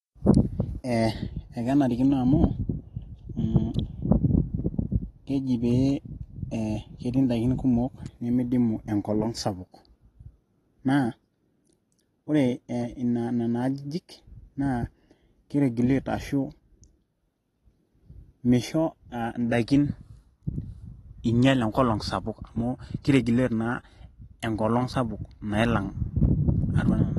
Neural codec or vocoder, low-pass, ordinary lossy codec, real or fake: none; 19.8 kHz; AAC, 32 kbps; real